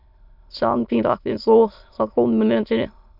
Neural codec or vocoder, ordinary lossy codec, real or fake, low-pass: autoencoder, 22.05 kHz, a latent of 192 numbers a frame, VITS, trained on many speakers; Opus, 64 kbps; fake; 5.4 kHz